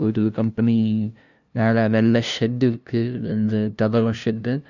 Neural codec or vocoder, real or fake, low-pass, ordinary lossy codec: codec, 16 kHz, 0.5 kbps, FunCodec, trained on LibriTTS, 25 frames a second; fake; 7.2 kHz; none